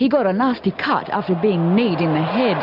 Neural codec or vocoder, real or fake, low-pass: none; real; 5.4 kHz